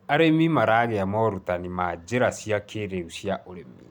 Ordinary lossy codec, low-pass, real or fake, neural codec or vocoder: none; 19.8 kHz; real; none